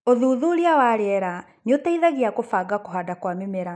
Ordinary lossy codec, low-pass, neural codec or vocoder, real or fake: none; none; none; real